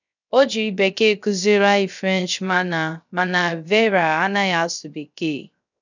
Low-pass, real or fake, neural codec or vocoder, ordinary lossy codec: 7.2 kHz; fake; codec, 16 kHz, 0.3 kbps, FocalCodec; none